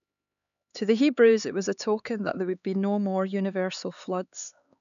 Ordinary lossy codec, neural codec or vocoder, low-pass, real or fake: none; codec, 16 kHz, 4 kbps, X-Codec, HuBERT features, trained on LibriSpeech; 7.2 kHz; fake